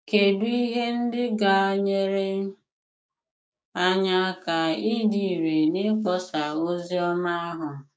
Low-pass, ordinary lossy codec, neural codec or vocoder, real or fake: none; none; codec, 16 kHz, 6 kbps, DAC; fake